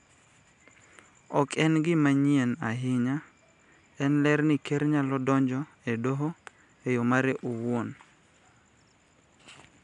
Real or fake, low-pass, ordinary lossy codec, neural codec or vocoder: real; 10.8 kHz; none; none